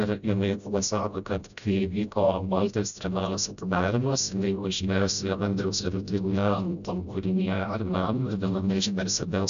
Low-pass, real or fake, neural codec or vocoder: 7.2 kHz; fake; codec, 16 kHz, 0.5 kbps, FreqCodec, smaller model